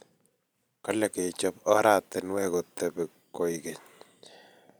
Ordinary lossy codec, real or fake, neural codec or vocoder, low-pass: none; fake; vocoder, 44.1 kHz, 128 mel bands every 512 samples, BigVGAN v2; none